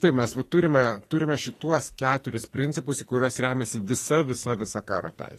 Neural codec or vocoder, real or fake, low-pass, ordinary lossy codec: codec, 44.1 kHz, 2.6 kbps, SNAC; fake; 14.4 kHz; AAC, 48 kbps